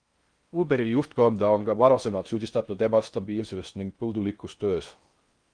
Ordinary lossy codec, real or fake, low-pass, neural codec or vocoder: Opus, 32 kbps; fake; 9.9 kHz; codec, 16 kHz in and 24 kHz out, 0.6 kbps, FocalCodec, streaming, 2048 codes